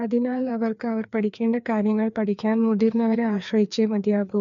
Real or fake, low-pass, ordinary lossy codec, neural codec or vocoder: fake; 7.2 kHz; none; codec, 16 kHz, 2 kbps, FreqCodec, larger model